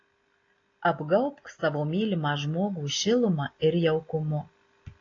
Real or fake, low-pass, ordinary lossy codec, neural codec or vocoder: real; 7.2 kHz; AAC, 32 kbps; none